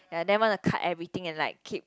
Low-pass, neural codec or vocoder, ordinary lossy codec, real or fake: none; none; none; real